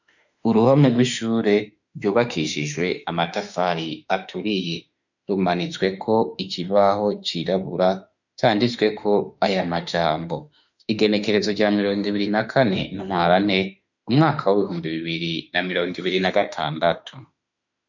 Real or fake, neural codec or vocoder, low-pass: fake; autoencoder, 48 kHz, 32 numbers a frame, DAC-VAE, trained on Japanese speech; 7.2 kHz